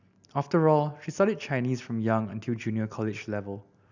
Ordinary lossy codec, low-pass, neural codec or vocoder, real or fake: none; 7.2 kHz; none; real